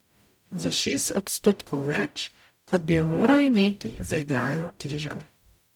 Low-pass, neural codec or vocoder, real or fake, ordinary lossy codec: 19.8 kHz; codec, 44.1 kHz, 0.9 kbps, DAC; fake; none